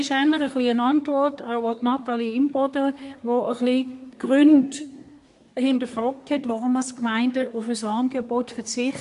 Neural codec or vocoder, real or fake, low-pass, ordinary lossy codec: codec, 24 kHz, 1 kbps, SNAC; fake; 10.8 kHz; MP3, 64 kbps